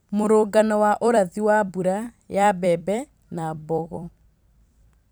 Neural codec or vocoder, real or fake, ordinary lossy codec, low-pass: vocoder, 44.1 kHz, 128 mel bands every 256 samples, BigVGAN v2; fake; none; none